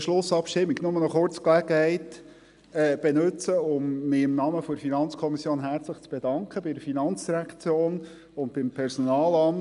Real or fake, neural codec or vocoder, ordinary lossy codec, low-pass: real; none; none; 10.8 kHz